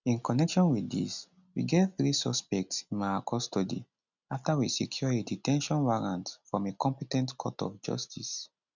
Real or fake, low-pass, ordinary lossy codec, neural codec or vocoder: real; 7.2 kHz; none; none